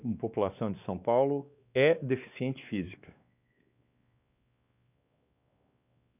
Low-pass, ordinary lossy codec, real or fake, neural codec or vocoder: 3.6 kHz; none; fake; codec, 16 kHz, 2 kbps, X-Codec, WavLM features, trained on Multilingual LibriSpeech